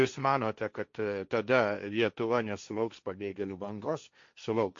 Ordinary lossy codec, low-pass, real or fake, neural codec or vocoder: MP3, 48 kbps; 7.2 kHz; fake; codec, 16 kHz, 1.1 kbps, Voila-Tokenizer